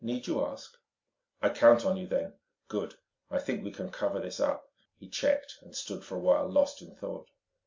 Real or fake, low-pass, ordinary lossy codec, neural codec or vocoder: real; 7.2 kHz; MP3, 64 kbps; none